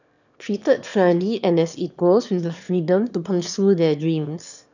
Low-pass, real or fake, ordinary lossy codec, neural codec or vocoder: 7.2 kHz; fake; none; autoencoder, 22.05 kHz, a latent of 192 numbers a frame, VITS, trained on one speaker